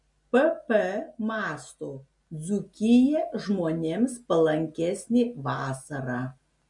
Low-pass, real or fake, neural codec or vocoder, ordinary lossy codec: 10.8 kHz; real; none; MP3, 48 kbps